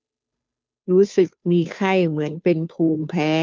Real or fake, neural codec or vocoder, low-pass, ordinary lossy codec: fake; codec, 16 kHz, 2 kbps, FunCodec, trained on Chinese and English, 25 frames a second; none; none